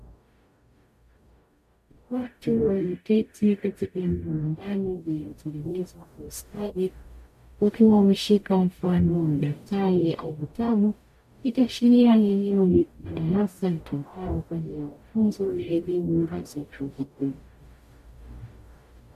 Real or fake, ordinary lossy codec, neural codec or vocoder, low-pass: fake; MP3, 64 kbps; codec, 44.1 kHz, 0.9 kbps, DAC; 14.4 kHz